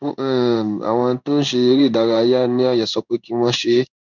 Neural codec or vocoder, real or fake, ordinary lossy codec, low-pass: codec, 16 kHz in and 24 kHz out, 1 kbps, XY-Tokenizer; fake; none; 7.2 kHz